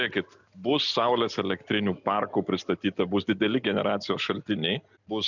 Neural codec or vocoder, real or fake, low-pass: none; real; 7.2 kHz